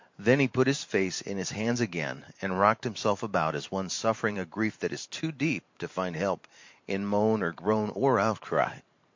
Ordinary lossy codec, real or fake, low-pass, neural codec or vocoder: MP3, 48 kbps; fake; 7.2 kHz; vocoder, 44.1 kHz, 128 mel bands every 512 samples, BigVGAN v2